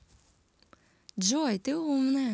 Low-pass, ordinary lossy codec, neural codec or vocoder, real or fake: none; none; none; real